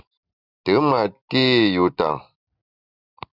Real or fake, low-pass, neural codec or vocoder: real; 5.4 kHz; none